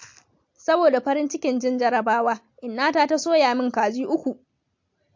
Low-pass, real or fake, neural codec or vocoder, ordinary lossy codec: 7.2 kHz; real; none; MP3, 48 kbps